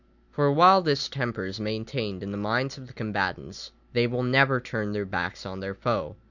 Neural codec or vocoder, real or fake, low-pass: none; real; 7.2 kHz